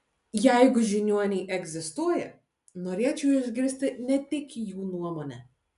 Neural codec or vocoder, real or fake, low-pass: none; real; 10.8 kHz